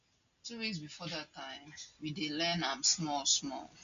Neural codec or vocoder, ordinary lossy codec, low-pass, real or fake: none; AAC, 64 kbps; 7.2 kHz; real